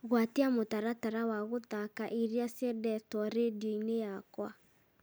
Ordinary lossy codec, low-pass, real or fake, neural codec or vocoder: none; none; real; none